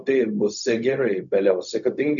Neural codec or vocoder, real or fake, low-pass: codec, 16 kHz, 0.4 kbps, LongCat-Audio-Codec; fake; 7.2 kHz